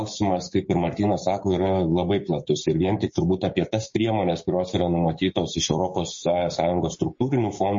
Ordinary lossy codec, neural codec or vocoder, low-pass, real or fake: MP3, 32 kbps; codec, 16 kHz, 6 kbps, DAC; 7.2 kHz; fake